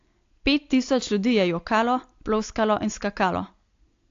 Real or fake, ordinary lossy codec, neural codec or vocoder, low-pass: real; AAC, 48 kbps; none; 7.2 kHz